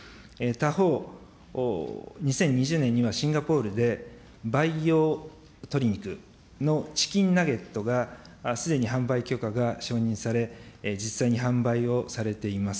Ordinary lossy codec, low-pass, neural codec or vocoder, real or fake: none; none; none; real